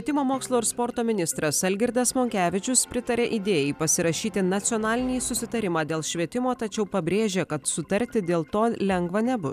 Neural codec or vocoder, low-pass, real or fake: none; 14.4 kHz; real